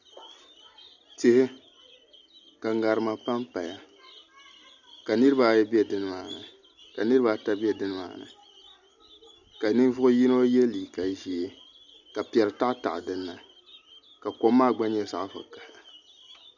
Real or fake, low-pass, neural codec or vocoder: real; 7.2 kHz; none